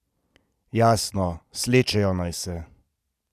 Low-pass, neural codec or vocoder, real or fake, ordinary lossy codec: 14.4 kHz; none; real; none